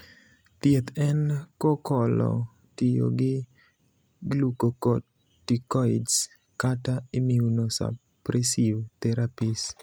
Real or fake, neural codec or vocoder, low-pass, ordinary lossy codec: real; none; none; none